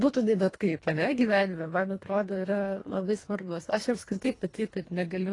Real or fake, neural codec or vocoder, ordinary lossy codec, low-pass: fake; codec, 24 kHz, 1.5 kbps, HILCodec; AAC, 32 kbps; 10.8 kHz